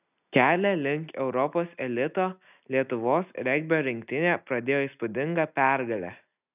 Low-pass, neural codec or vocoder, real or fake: 3.6 kHz; none; real